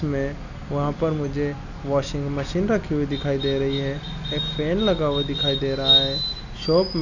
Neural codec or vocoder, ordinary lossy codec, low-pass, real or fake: none; none; 7.2 kHz; real